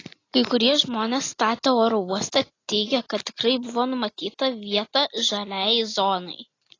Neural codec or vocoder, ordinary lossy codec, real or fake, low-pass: none; AAC, 32 kbps; real; 7.2 kHz